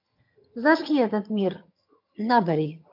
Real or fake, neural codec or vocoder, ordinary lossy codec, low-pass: fake; vocoder, 22.05 kHz, 80 mel bands, HiFi-GAN; MP3, 48 kbps; 5.4 kHz